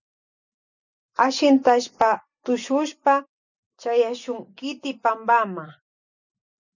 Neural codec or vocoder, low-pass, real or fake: none; 7.2 kHz; real